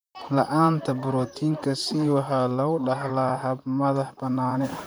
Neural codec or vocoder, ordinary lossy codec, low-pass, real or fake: vocoder, 44.1 kHz, 128 mel bands, Pupu-Vocoder; none; none; fake